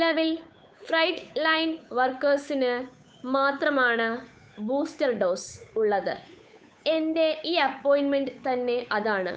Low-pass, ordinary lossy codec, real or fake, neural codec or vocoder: none; none; fake; codec, 16 kHz, 8 kbps, FunCodec, trained on Chinese and English, 25 frames a second